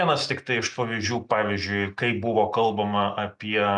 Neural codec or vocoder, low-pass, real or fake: none; 10.8 kHz; real